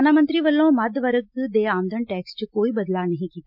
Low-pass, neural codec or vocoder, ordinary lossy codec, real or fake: 5.4 kHz; none; MP3, 48 kbps; real